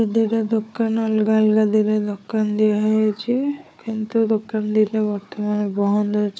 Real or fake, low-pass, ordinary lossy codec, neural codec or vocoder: fake; none; none; codec, 16 kHz, 4 kbps, FunCodec, trained on Chinese and English, 50 frames a second